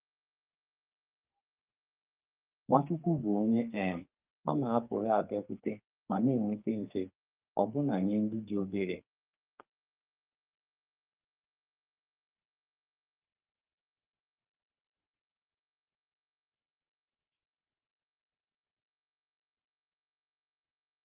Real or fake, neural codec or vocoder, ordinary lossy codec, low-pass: fake; codec, 44.1 kHz, 2.6 kbps, SNAC; Opus, 24 kbps; 3.6 kHz